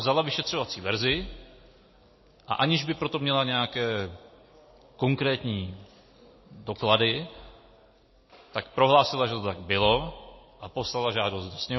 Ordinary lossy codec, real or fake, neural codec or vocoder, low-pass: MP3, 24 kbps; real; none; 7.2 kHz